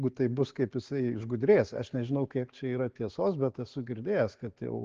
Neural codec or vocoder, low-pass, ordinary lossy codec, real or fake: none; 7.2 kHz; Opus, 16 kbps; real